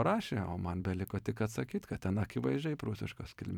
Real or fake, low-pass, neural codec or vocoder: fake; 19.8 kHz; vocoder, 44.1 kHz, 128 mel bands every 256 samples, BigVGAN v2